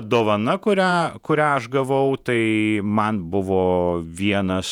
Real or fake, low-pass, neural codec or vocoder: fake; 19.8 kHz; vocoder, 48 kHz, 128 mel bands, Vocos